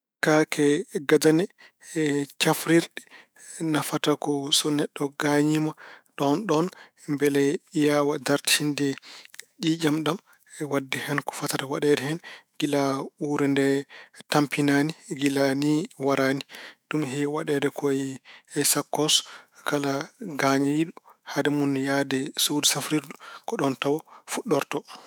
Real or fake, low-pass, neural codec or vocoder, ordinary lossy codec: fake; none; autoencoder, 48 kHz, 128 numbers a frame, DAC-VAE, trained on Japanese speech; none